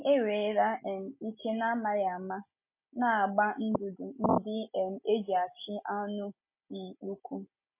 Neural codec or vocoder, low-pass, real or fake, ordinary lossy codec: none; 3.6 kHz; real; MP3, 24 kbps